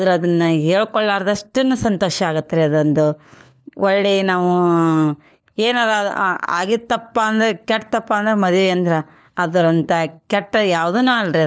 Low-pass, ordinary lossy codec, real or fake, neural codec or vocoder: none; none; fake; codec, 16 kHz, 4 kbps, FunCodec, trained on LibriTTS, 50 frames a second